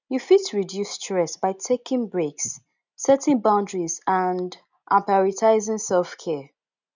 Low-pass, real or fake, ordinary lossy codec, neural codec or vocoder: 7.2 kHz; real; none; none